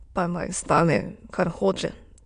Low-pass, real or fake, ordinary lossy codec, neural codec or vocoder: 9.9 kHz; fake; MP3, 96 kbps; autoencoder, 22.05 kHz, a latent of 192 numbers a frame, VITS, trained on many speakers